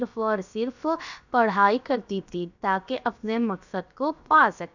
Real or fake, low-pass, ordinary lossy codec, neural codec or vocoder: fake; 7.2 kHz; none; codec, 16 kHz, about 1 kbps, DyCAST, with the encoder's durations